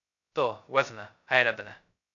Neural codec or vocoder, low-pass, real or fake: codec, 16 kHz, 0.2 kbps, FocalCodec; 7.2 kHz; fake